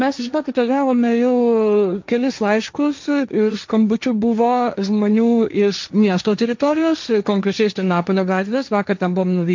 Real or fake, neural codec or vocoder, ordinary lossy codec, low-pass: fake; codec, 16 kHz, 1.1 kbps, Voila-Tokenizer; MP3, 64 kbps; 7.2 kHz